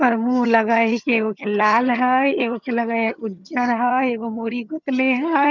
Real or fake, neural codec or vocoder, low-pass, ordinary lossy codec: fake; vocoder, 22.05 kHz, 80 mel bands, HiFi-GAN; 7.2 kHz; none